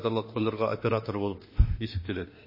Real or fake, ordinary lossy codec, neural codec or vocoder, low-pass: fake; MP3, 24 kbps; autoencoder, 48 kHz, 32 numbers a frame, DAC-VAE, trained on Japanese speech; 5.4 kHz